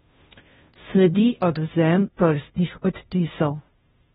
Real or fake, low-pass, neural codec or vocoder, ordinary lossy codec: fake; 7.2 kHz; codec, 16 kHz, 0.5 kbps, FunCodec, trained on Chinese and English, 25 frames a second; AAC, 16 kbps